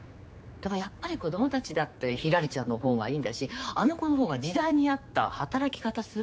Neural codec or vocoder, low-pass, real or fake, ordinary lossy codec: codec, 16 kHz, 4 kbps, X-Codec, HuBERT features, trained on general audio; none; fake; none